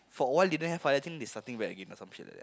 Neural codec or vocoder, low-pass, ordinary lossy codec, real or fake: none; none; none; real